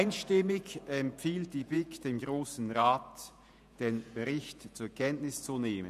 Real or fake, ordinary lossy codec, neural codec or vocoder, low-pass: fake; none; vocoder, 48 kHz, 128 mel bands, Vocos; 14.4 kHz